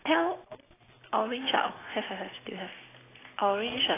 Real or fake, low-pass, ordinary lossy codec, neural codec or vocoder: real; 3.6 kHz; AAC, 16 kbps; none